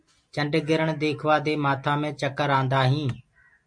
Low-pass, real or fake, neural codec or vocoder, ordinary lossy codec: 9.9 kHz; real; none; MP3, 48 kbps